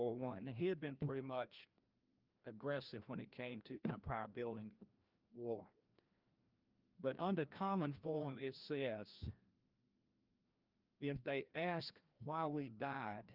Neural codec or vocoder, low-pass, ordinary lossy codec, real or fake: codec, 16 kHz, 1 kbps, FreqCodec, larger model; 5.4 kHz; Opus, 24 kbps; fake